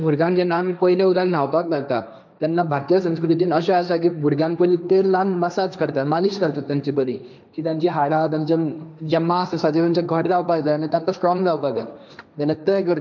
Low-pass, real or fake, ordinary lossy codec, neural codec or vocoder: 7.2 kHz; fake; none; codec, 16 kHz, 1.1 kbps, Voila-Tokenizer